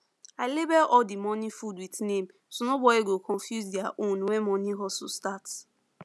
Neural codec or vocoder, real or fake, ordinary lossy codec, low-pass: none; real; none; none